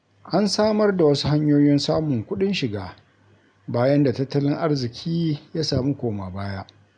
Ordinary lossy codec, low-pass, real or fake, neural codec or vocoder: none; 9.9 kHz; real; none